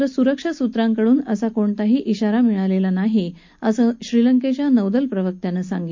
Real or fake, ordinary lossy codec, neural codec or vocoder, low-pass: real; MP3, 48 kbps; none; 7.2 kHz